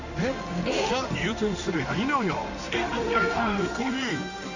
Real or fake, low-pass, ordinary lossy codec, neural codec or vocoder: fake; 7.2 kHz; none; codec, 16 kHz in and 24 kHz out, 1 kbps, XY-Tokenizer